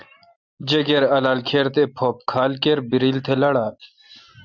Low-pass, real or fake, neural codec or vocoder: 7.2 kHz; real; none